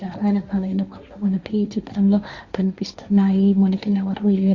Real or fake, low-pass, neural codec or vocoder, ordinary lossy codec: fake; 7.2 kHz; codec, 16 kHz, 1.1 kbps, Voila-Tokenizer; Opus, 64 kbps